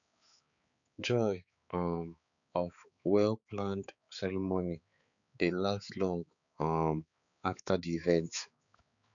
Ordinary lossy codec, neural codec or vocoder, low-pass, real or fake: none; codec, 16 kHz, 4 kbps, X-Codec, HuBERT features, trained on balanced general audio; 7.2 kHz; fake